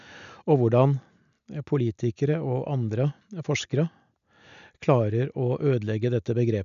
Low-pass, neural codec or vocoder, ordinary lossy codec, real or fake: 7.2 kHz; none; none; real